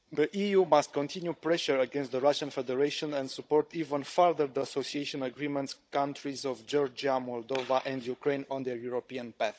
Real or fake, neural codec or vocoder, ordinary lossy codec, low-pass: fake; codec, 16 kHz, 16 kbps, FunCodec, trained on Chinese and English, 50 frames a second; none; none